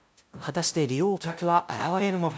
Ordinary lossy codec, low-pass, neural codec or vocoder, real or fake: none; none; codec, 16 kHz, 0.5 kbps, FunCodec, trained on LibriTTS, 25 frames a second; fake